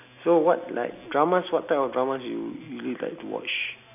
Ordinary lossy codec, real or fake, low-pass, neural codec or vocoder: none; real; 3.6 kHz; none